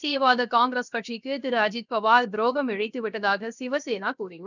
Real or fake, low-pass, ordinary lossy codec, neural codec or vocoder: fake; 7.2 kHz; none; codec, 16 kHz, 0.7 kbps, FocalCodec